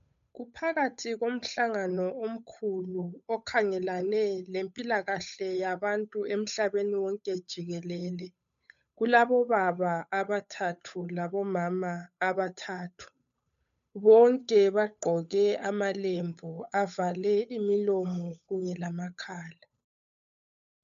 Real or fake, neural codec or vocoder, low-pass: fake; codec, 16 kHz, 8 kbps, FunCodec, trained on Chinese and English, 25 frames a second; 7.2 kHz